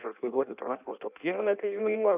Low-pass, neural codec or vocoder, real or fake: 3.6 kHz; codec, 16 kHz in and 24 kHz out, 0.6 kbps, FireRedTTS-2 codec; fake